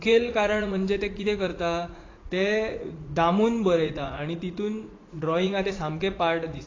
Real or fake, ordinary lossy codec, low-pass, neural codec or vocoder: fake; AAC, 32 kbps; 7.2 kHz; vocoder, 44.1 kHz, 80 mel bands, Vocos